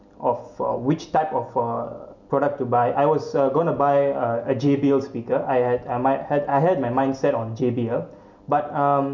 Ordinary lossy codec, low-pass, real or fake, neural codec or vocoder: none; 7.2 kHz; real; none